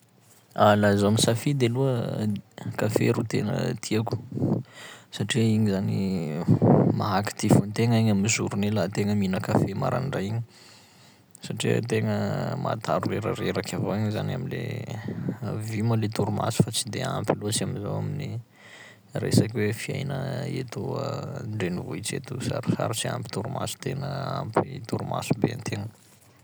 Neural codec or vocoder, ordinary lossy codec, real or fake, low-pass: none; none; real; none